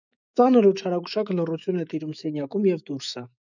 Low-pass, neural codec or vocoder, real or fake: 7.2 kHz; autoencoder, 48 kHz, 128 numbers a frame, DAC-VAE, trained on Japanese speech; fake